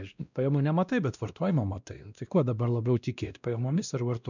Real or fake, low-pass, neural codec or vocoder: fake; 7.2 kHz; codec, 16 kHz, 1 kbps, X-Codec, WavLM features, trained on Multilingual LibriSpeech